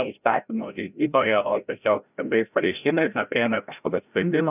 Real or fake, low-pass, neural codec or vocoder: fake; 3.6 kHz; codec, 16 kHz, 0.5 kbps, FreqCodec, larger model